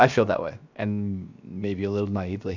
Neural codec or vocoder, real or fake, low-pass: codec, 16 kHz, 0.7 kbps, FocalCodec; fake; 7.2 kHz